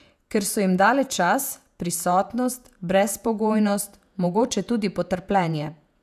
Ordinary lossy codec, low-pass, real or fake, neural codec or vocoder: none; 14.4 kHz; fake; vocoder, 48 kHz, 128 mel bands, Vocos